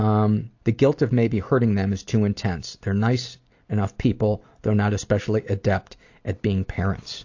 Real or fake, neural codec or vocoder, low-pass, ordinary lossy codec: real; none; 7.2 kHz; AAC, 48 kbps